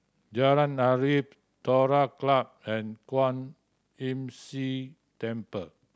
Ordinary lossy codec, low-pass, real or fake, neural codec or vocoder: none; none; real; none